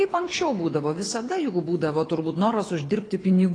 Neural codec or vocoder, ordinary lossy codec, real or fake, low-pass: codec, 24 kHz, 6 kbps, HILCodec; AAC, 32 kbps; fake; 9.9 kHz